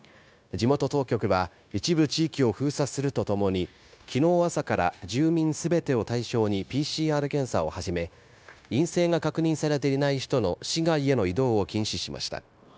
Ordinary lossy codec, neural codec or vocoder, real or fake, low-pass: none; codec, 16 kHz, 0.9 kbps, LongCat-Audio-Codec; fake; none